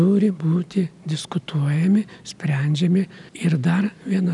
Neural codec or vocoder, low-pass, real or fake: none; 10.8 kHz; real